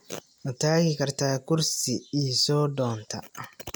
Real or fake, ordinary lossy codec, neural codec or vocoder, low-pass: real; none; none; none